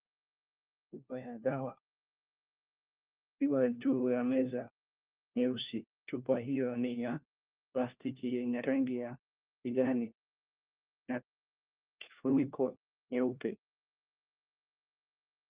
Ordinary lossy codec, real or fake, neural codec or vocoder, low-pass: Opus, 32 kbps; fake; codec, 16 kHz, 1 kbps, FunCodec, trained on LibriTTS, 50 frames a second; 3.6 kHz